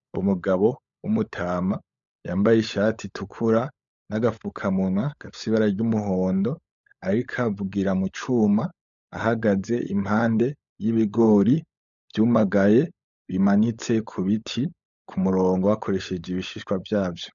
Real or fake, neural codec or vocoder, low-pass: fake; codec, 16 kHz, 16 kbps, FunCodec, trained on LibriTTS, 50 frames a second; 7.2 kHz